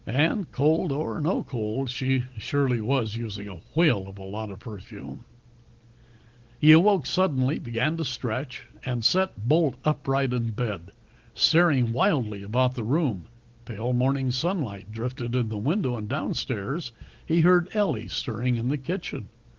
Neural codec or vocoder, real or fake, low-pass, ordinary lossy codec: none; real; 7.2 kHz; Opus, 16 kbps